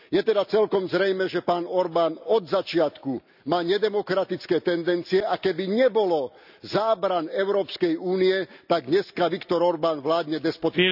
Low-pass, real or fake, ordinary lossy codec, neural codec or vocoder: 5.4 kHz; real; none; none